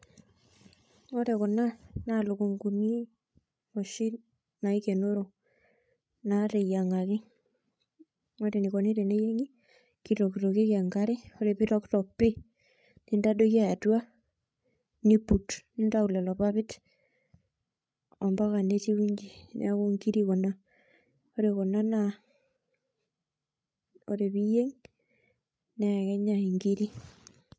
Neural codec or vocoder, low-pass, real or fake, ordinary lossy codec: codec, 16 kHz, 16 kbps, FreqCodec, larger model; none; fake; none